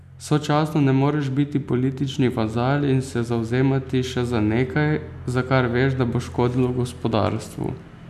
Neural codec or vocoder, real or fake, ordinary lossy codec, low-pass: none; real; none; 14.4 kHz